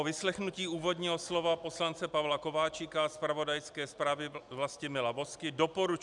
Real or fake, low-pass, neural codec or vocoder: real; 10.8 kHz; none